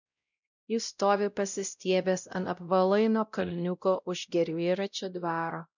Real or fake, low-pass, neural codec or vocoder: fake; 7.2 kHz; codec, 16 kHz, 0.5 kbps, X-Codec, WavLM features, trained on Multilingual LibriSpeech